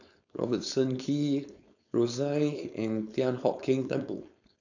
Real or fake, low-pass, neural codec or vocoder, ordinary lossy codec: fake; 7.2 kHz; codec, 16 kHz, 4.8 kbps, FACodec; none